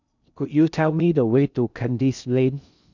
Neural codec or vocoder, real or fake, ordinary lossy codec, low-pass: codec, 16 kHz in and 24 kHz out, 0.6 kbps, FocalCodec, streaming, 2048 codes; fake; none; 7.2 kHz